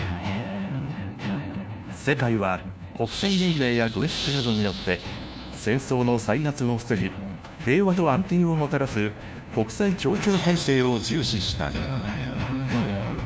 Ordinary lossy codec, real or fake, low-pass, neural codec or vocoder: none; fake; none; codec, 16 kHz, 1 kbps, FunCodec, trained on LibriTTS, 50 frames a second